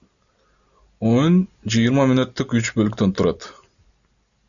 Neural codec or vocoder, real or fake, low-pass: none; real; 7.2 kHz